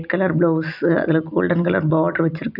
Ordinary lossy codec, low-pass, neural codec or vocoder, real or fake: none; 5.4 kHz; none; real